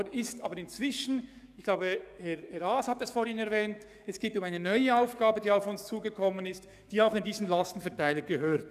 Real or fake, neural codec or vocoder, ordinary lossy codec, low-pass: fake; codec, 44.1 kHz, 7.8 kbps, DAC; none; 14.4 kHz